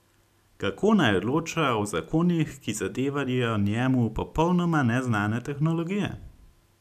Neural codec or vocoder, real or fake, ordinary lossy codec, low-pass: none; real; none; 14.4 kHz